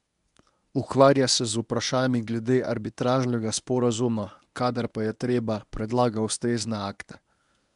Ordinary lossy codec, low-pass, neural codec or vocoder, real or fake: none; 10.8 kHz; codec, 24 kHz, 0.9 kbps, WavTokenizer, medium speech release version 1; fake